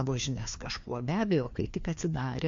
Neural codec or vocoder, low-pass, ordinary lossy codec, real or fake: codec, 16 kHz, 2 kbps, FreqCodec, larger model; 7.2 kHz; MP3, 48 kbps; fake